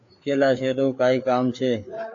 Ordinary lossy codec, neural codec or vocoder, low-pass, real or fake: AAC, 48 kbps; codec, 16 kHz, 4 kbps, FreqCodec, larger model; 7.2 kHz; fake